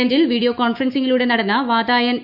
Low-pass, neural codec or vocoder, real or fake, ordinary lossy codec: 5.4 kHz; autoencoder, 48 kHz, 128 numbers a frame, DAC-VAE, trained on Japanese speech; fake; Opus, 64 kbps